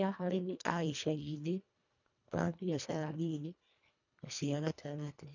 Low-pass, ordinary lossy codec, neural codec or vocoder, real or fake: 7.2 kHz; none; codec, 24 kHz, 1.5 kbps, HILCodec; fake